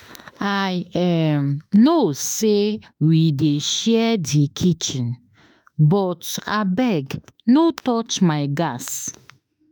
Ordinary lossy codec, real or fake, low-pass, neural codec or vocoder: none; fake; none; autoencoder, 48 kHz, 32 numbers a frame, DAC-VAE, trained on Japanese speech